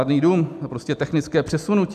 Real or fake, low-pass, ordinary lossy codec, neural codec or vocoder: real; 14.4 kHz; MP3, 96 kbps; none